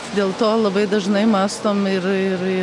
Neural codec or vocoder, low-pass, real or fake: none; 10.8 kHz; real